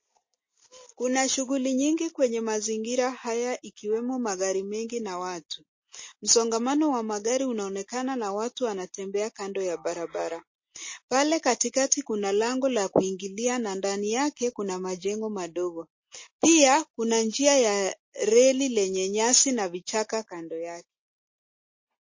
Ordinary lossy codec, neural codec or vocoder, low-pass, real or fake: MP3, 32 kbps; none; 7.2 kHz; real